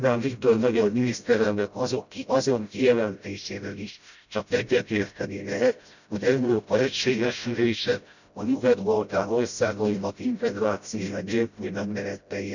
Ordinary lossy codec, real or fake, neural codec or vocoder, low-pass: none; fake; codec, 16 kHz, 0.5 kbps, FreqCodec, smaller model; 7.2 kHz